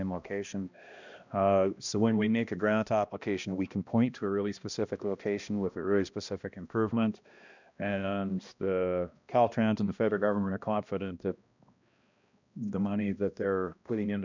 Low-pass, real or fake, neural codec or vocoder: 7.2 kHz; fake; codec, 16 kHz, 1 kbps, X-Codec, HuBERT features, trained on balanced general audio